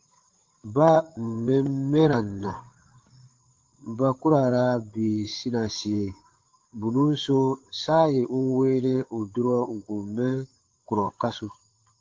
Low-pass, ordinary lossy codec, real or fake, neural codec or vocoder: 7.2 kHz; Opus, 16 kbps; fake; codec, 16 kHz, 8 kbps, FreqCodec, larger model